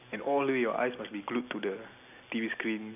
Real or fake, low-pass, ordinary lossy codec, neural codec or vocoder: real; 3.6 kHz; none; none